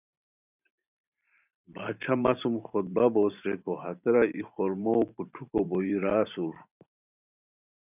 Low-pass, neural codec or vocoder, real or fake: 3.6 kHz; vocoder, 44.1 kHz, 128 mel bands every 512 samples, BigVGAN v2; fake